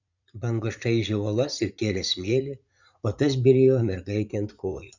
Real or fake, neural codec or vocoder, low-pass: fake; vocoder, 44.1 kHz, 80 mel bands, Vocos; 7.2 kHz